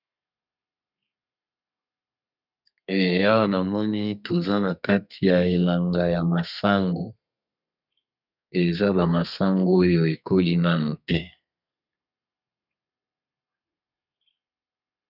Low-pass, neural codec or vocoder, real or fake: 5.4 kHz; codec, 32 kHz, 1.9 kbps, SNAC; fake